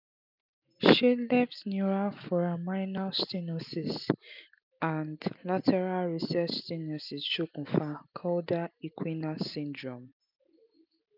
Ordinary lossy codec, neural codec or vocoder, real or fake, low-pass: none; none; real; 5.4 kHz